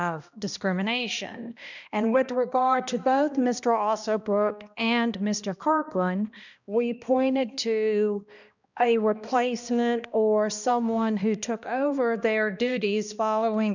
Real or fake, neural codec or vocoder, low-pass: fake; codec, 16 kHz, 1 kbps, X-Codec, HuBERT features, trained on balanced general audio; 7.2 kHz